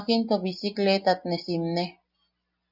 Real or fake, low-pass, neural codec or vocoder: real; 5.4 kHz; none